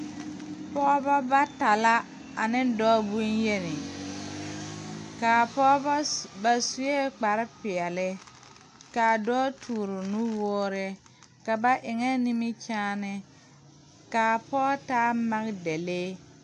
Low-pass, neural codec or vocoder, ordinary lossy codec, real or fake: 10.8 kHz; none; AAC, 64 kbps; real